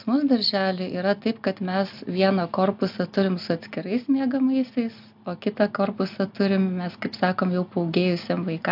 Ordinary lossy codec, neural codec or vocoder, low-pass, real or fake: AAC, 48 kbps; none; 5.4 kHz; real